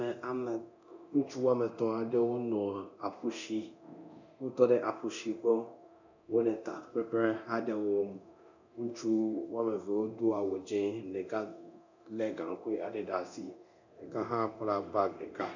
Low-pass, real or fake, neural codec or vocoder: 7.2 kHz; fake; codec, 24 kHz, 0.9 kbps, DualCodec